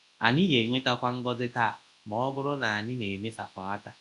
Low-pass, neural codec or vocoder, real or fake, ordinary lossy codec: 10.8 kHz; codec, 24 kHz, 0.9 kbps, WavTokenizer, large speech release; fake; AAC, 64 kbps